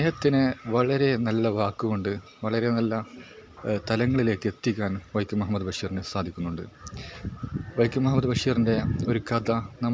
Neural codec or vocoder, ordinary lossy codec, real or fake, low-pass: none; none; real; none